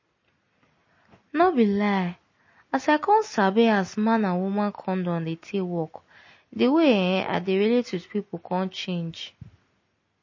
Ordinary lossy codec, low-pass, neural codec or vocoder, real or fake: MP3, 32 kbps; 7.2 kHz; none; real